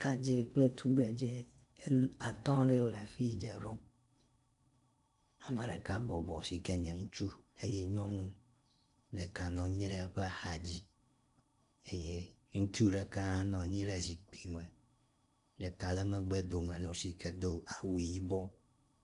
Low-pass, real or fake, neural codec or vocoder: 10.8 kHz; fake; codec, 16 kHz in and 24 kHz out, 0.8 kbps, FocalCodec, streaming, 65536 codes